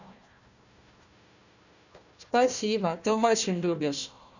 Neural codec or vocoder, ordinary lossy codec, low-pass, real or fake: codec, 16 kHz, 1 kbps, FunCodec, trained on Chinese and English, 50 frames a second; none; 7.2 kHz; fake